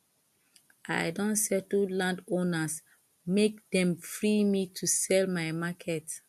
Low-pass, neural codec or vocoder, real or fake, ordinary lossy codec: 14.4 kHz; none; real; MP3, 64 kbps